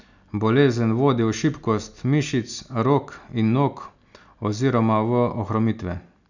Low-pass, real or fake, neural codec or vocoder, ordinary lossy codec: 7.2 kHz; real; none; none